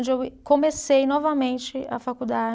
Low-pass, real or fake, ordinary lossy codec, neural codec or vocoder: none; real; none; none